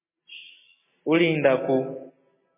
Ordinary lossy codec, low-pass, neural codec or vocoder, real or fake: MP3, 16 kbps; 3.6 kHz; none; real